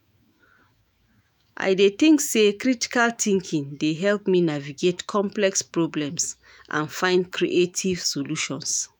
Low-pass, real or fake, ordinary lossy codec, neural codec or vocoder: 19.8 kHz; fake; none; autoencoder, 48 kHz, 128 numbers a frame, DAC-VAE, trained on Japanese speech